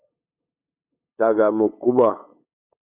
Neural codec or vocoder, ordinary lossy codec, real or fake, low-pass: codec, 16 kHz, 8 kbps, FunCodec, trained on LibriTTS, 25 frames a second; Opus, 64 kbps; fake; 3.6 kHz